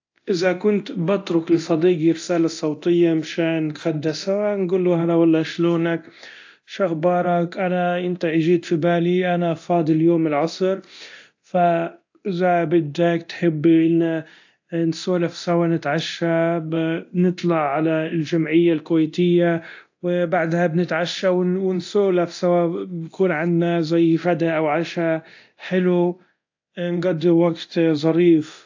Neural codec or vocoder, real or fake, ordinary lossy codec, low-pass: codec, 24 kHz, 0.9 kbps, DualCodec; fake; AAC, 48 kbps; 7.2 kHz